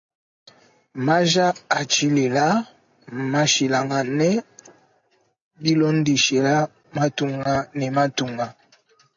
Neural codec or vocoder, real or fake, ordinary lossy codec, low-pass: none; real; AAC, 48 kbps; 7.2 kHz